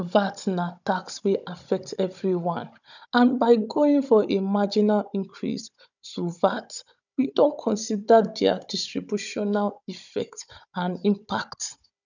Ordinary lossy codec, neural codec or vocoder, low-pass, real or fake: none; codec, 16 kHz, 16 kbps, FunCodec, trained on Chinese and English, 50 frames a second; 7.2 kHz; fake